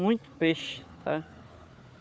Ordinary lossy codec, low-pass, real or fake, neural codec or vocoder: none; none; fake; codec, 16 kHz, 4 kbps, FunCodec, trained on Chinese and English, 50 frames a second